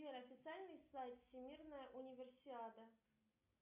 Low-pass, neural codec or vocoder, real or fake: 3.6 kHz; none; real